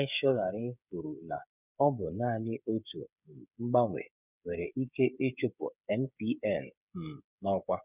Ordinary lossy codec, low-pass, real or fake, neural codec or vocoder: none; 3.6 kHz; fake; codec, 16 kHz, 8 kbps, FreqCodec, smaller model